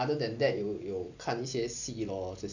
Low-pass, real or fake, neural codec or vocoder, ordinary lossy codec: 7.2 kHz; real; none; none